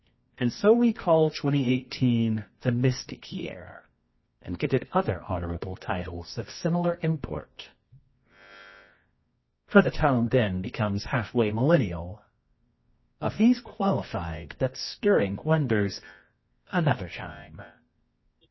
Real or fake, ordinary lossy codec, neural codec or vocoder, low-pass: fake; MP3, 24 kbps; codec, 24 kHz, 0.9 kbps, WavTokenizer, medium music audio release; 7.2 kHz